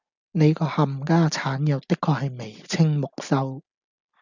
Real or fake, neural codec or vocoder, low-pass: real; none; 7.2 kHz